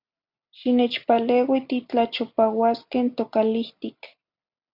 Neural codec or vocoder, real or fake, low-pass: none; real; 5.4 kHz